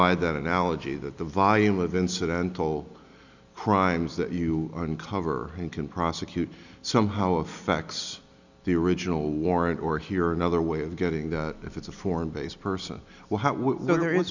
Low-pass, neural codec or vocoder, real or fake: 7.2 kHz; none; real